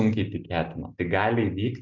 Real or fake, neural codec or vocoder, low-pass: real; none; 7.2 kHz